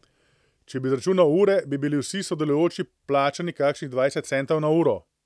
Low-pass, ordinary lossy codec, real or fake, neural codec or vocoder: none; none; real; none